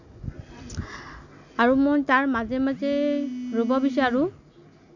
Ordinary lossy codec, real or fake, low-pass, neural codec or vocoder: none; real; 7.2 kHz; none